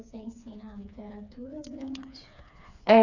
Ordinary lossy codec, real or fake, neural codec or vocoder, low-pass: none; fake; codec, 16 kHz, 4 kbps, FreqCodec, smaller model; 7.2 kHz